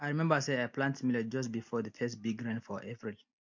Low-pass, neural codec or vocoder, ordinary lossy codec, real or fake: 7.2 kHz; none; MP3, 48 kbps; real